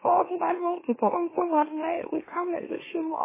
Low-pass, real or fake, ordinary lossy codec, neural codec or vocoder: 3.6 kHz; fake; MP3, 16 kbps; autoencoder, 44.1 kHz, a latent of 192 numbers a frame, MeloTTS